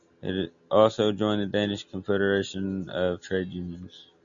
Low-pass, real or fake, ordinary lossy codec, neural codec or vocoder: 7.2 kHz; real; MP3, 64 kbps; none